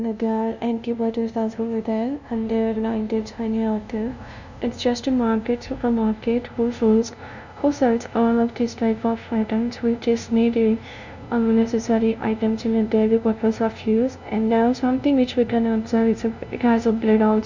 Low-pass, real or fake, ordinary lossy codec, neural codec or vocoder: 7.2 kHz; fake; none; codec, 16 kHz, 0.5 kbps, FunCodec, trained on LibriTTS, 25 frames a second